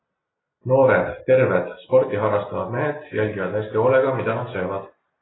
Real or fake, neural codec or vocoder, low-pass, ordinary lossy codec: real; none; 7.2 kHz; AAC, 16 kbps